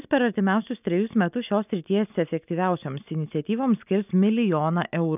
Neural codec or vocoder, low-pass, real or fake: none; 3.6 kHz; real